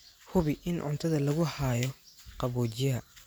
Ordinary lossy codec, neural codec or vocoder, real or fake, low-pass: none; vocoder, 44.1 kHz, 128 mel bands every 512 samples, BigVGAN v2; fake; none